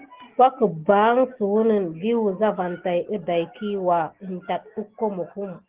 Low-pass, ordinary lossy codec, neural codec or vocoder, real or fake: 3.6 kHz; Opus, 16 kbps; none; real